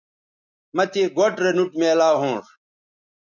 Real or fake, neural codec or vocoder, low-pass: real; none; 7.2 kHz